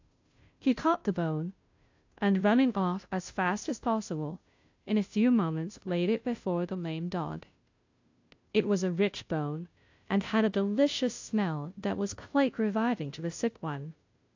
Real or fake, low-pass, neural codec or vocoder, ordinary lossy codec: fake; 7.2 kHz; codec, 16 kHz, 0.5 kbps, FunCodec, trained on Chinese and English, 25 frames a second; AAC, 48 kbps